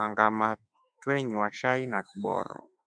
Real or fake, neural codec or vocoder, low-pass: fake; autoencoder, 48 kHz, 32 numbers a frame, DAC-VAE, trained on Japanese speech; 9.9 kHz